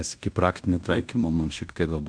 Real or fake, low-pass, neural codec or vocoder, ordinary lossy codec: fake; 9.9 kHz; codec, 16 kHz in and 24 kHz out, 0.9 kbps, LongCat-Audio-Codec, fine tuned four codebook decoder; AAC, 64 kbps